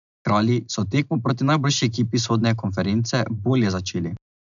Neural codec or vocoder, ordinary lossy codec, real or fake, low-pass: none; none; real; 7.2 kHz